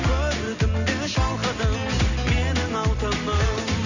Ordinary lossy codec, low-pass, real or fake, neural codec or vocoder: none; 7.2 kHz; real; none